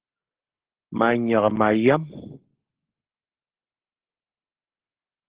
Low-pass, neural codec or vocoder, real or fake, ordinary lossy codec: 3.6 kHz; codec, 44.1 kHz, 7.8 kbps, Pupu-Codec; fake; Opus, 16 kbps